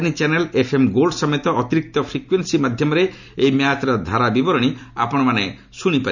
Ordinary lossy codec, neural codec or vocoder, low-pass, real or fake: none; none; 7.2 kHz; real